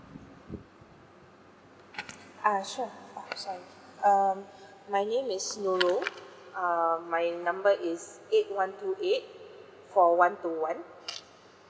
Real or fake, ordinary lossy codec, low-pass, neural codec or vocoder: real; none; none; none